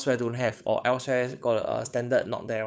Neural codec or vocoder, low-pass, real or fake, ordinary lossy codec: codec, 16 kHz, 8 kbps, FunCodec, trained on Chinese and English, 25 frames a second; none; fake; none